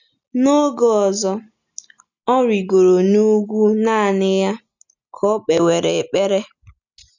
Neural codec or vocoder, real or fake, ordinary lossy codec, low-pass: none; real; none; 7.2 kHz